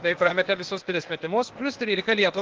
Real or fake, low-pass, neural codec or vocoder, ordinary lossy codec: fake; 7.2 kHz; codec, 16 kHz, 0.8 kbps, ZipCodec; Opus, 16 kbps